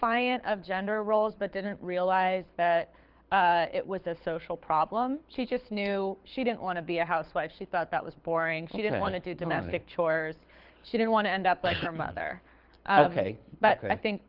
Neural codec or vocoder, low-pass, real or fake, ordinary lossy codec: codec, 24 kHz, 6 kbps, HILCodec; 5.4 kHz; fake; Opus, 24 kbps